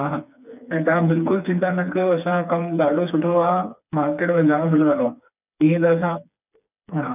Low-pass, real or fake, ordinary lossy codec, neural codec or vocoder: 3.6 kHz; fake; none; codec, 16 kHz, 4 kbps, FreqCodec, smaller model